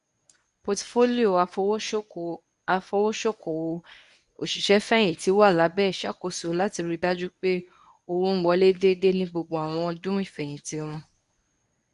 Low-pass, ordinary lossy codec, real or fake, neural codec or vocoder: 10.8 kHz; none; fake; codec, 24 kHz, 0.9 kbps, WavTokenizer, medium speech release version 1